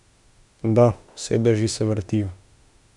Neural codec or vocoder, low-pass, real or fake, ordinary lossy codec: autoencoder, 48 kHz, 32 numbers a frame, DAC-VAE, trained on Japanese speech; 10.8 kHz; fake; none